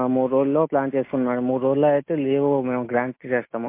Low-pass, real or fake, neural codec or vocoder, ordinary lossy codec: 3.6 kHz; real; none; MP3, 24 kbps